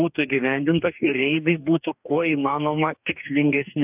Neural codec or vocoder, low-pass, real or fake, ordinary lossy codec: codec, 44.1 kHz, 2.6 kbps, DAC; 3.6 kHz; fake; AAC, 32 kbps